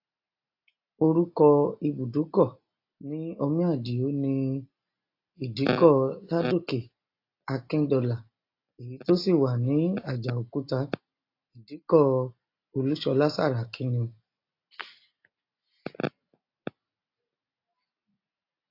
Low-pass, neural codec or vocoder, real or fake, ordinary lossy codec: 5.4 kHz; none; real; AAC, 32 kbps